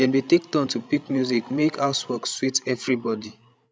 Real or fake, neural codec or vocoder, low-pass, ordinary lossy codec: fake; codec, 16 kHz, 16 kbps, FreqCodec, larger model; none; none